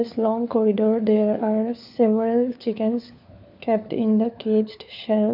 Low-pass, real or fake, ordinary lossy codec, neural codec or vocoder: 5.4 kHz; fake; none; codec, 16 kHz, 4 kbps, FunCodec, trained on LibriTTS, 50 frames a second